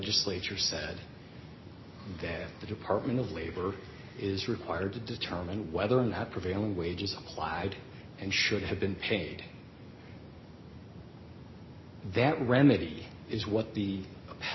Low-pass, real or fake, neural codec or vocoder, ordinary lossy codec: 7.2 kHz; real; none; MP3, 24 kbps